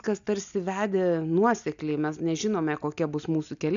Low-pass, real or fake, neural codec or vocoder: 7.2 kHz; real; none